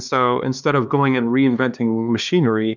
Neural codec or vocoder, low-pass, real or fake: codec, 16 kHz, 2 kbps, X-Codec, HuBERT features, trained on LibriSpeech; 7.2 kHz; fake